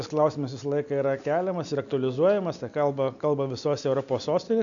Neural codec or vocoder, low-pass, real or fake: none; 7.2 kHz; real